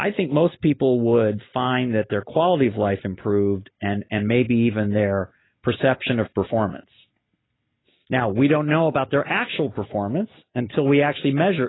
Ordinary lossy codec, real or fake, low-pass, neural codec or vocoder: AAC, 16 kbps; real; 7.2 kHz; none